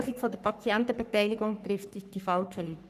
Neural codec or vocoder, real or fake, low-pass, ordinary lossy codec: codec, 44.1 kHz, 3.4 kbps, Pupu-Codec; fake; 14.4 kHz; none